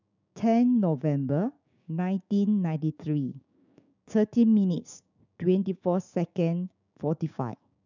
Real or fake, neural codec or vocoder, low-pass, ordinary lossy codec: fake; codec, 16 kHz, 6 kbps, DAC; 7.2 kHz; none